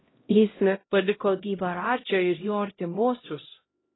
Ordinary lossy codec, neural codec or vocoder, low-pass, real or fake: AAC, 16 kbps; codec, 16 kHz, 0.5 kbps, X-Codec, HuBERT features, trained on LibriSpeech; 7.2 kHz; fake